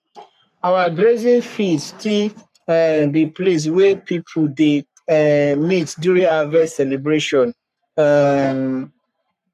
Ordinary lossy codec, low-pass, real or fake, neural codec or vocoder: none; 14.4 kHz; fake; codec, 44.1 kHz, 3.4 kbps, Pupu-Codec